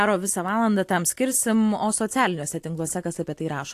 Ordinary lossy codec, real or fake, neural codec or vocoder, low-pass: AAC, 64 kbps; real; none; 14.4 kHz